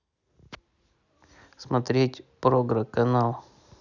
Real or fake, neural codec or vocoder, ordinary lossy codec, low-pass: real; none; none; 7.2 kHz